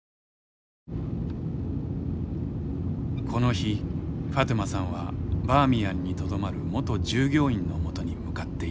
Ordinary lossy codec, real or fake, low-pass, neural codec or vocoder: none; real; none; none